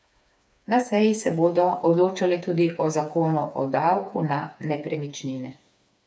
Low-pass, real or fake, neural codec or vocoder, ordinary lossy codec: none; fake; codec, 16 kHz, 4 kbps, FreqCodec, smaller model; none